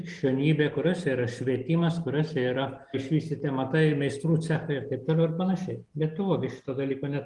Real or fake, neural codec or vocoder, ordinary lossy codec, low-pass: real; none; Opus, 24 kbps; 10.8 kHz